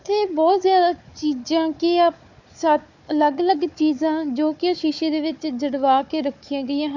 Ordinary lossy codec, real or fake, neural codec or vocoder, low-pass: none; fake; codec, 16 kHz, 4 kbps, FunCodec, trained on Chinese and English, 50 frames a second; 7.2 kHz